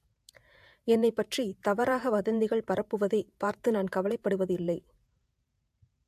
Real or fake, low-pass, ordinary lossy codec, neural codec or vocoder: fake; 14.4 kHz; AAC, 96 kbps; vocoder, 48 kHz, 128 mel bands, Vocos